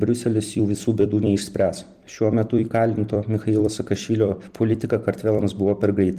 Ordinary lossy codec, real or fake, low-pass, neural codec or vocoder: Opus, 24 kbps; fake; 14.4 kHz; vocoder, 44.1 kHz, 128 mel bands every 256 samples, BigVGAN v2